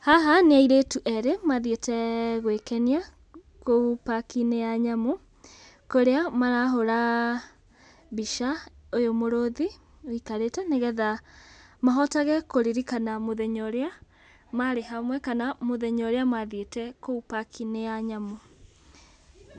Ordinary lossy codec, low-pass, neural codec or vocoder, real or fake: none; 10.8 kHz; none; real